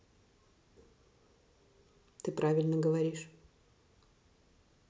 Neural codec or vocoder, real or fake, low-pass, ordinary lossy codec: none; real; none; none